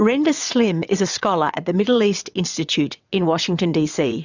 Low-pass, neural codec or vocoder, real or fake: 7.2 kHz; vocoder, 22.05 kHz, 80 mel bands, WaveNeXt; fake